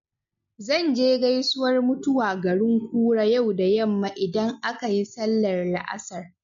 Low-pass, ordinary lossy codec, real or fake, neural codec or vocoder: 7.2 kHz; MP3, 48 kbps; real; none